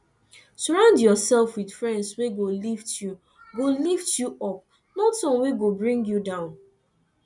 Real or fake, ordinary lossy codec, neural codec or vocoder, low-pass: real; none; none; 10.8 kHz